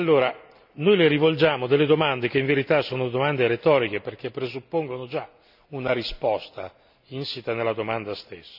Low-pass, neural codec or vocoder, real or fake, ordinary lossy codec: 5.4 kHz; none; real; none